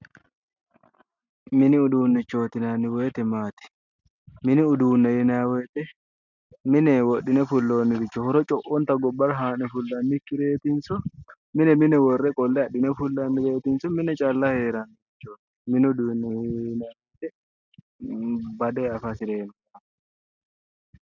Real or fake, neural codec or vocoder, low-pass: real; none; 7.2 kHz